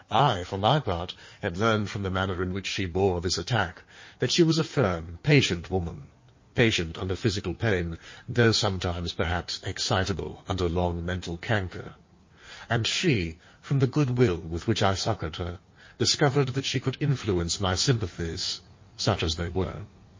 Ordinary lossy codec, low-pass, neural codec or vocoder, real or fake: MP3, 32 kbps; 7.2 kHz; codec, 16 kHz in and 24 kHz out, 1.1 kbps, FireRedTTS-2 codec; fake